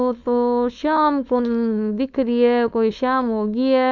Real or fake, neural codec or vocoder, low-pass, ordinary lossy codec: fake; codec, 16 kHz, 4.8 kbps, FACodec; 7.2 kHz; none